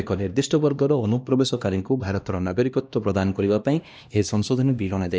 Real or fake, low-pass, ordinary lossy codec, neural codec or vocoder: fake; none; none; codec, 16 kHz, 1 kbps, X-Codec, HuBERT features, trained on LibriSpeech